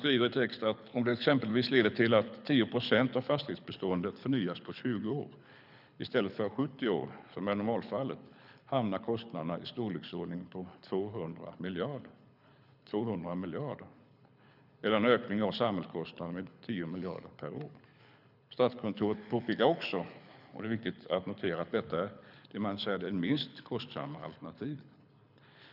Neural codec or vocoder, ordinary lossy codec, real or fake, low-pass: codec, 24 kHz, 6 kbps, HILCodec; none; fake; 5.4 kHz